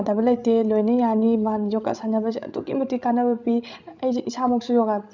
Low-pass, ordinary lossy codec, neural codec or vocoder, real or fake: 7.2 kHz; none; codec, 16 kHz, 16 kbps, FreqCodec, larger model; fake